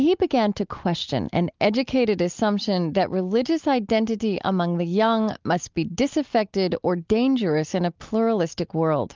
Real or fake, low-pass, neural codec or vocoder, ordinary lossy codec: real; 7.2 kHz; none; Opus, 24 kbps